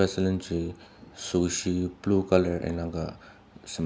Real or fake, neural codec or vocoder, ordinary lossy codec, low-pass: real; none; none; none